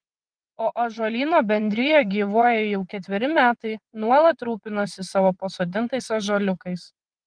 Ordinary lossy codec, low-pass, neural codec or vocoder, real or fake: Opus, 24 kbps; 9.9 kHz; vocoder, 22.05 kHz, 80 mel bands, WaveNeXt; fake